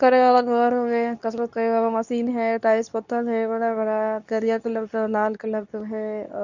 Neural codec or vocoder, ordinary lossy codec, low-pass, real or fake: codec, 24 kHz, 0.9 kbps, WavTokenizer, medium speech release version 2; none; 7.2 kHz; fake